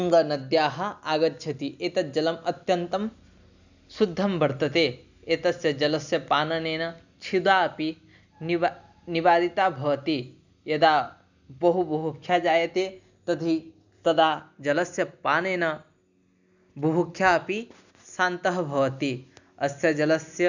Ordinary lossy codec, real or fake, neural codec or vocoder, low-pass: none; real; none; 7.2 kHz